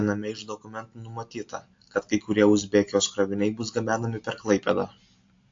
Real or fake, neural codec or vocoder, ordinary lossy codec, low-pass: real; none; AAC, 48 kbps; 7.2 kHz